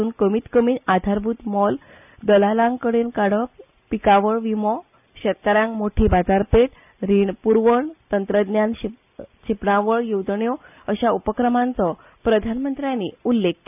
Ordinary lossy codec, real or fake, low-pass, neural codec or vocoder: none; real; 3.6 kHz; none